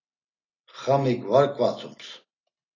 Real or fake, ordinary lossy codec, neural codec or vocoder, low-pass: real; AAC, 32 kbps; none; 7.2 kHz